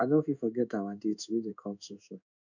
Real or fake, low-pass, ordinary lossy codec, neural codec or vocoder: fake; 7.2 kHz; none; codec, 16 kHz in and 24 kHz out, 1 kbps, XY-Tokenizer